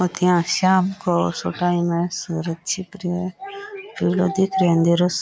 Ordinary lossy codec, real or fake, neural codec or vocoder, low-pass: none; real; none; none